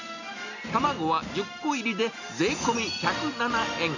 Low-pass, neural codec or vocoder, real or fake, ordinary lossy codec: 7.2 kHz; none; real; none